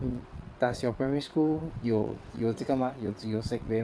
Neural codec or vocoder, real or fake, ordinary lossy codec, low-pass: vocoder, 22.05 kHz, 80 mel bands, WaveNeXt; fake; none; none